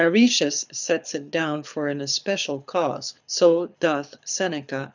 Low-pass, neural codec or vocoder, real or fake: 7.2 kHz; codec, 24 kHz, 6 kbps, HILCodec; fake